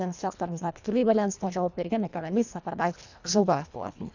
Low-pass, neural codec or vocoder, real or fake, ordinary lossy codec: 7.2 kHz; codec, 24 kHz, 1.5 kbps, HILCodec; fake; none